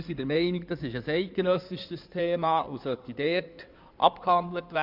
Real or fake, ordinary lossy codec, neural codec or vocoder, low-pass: fake; MP3, 48 kbps; codec, 16 kHz in and 24 kHz out, 2.2 kbps, FireRedTTS-2 codec; 5.4 kHz